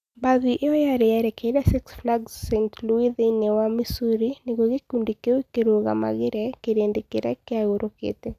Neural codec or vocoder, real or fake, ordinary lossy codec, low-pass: none; real; none; 14.4 kHz